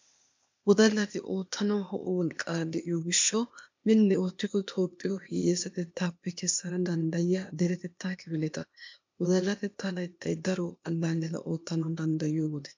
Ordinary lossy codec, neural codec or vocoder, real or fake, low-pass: MP3, 64 kbps; codec, 16 kHz, 0.8 kbps, ZipCodec; fake; 7.2 kHz